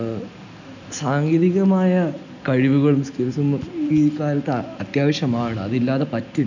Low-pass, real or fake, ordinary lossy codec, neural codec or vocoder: 7.2 kHz; real; none; none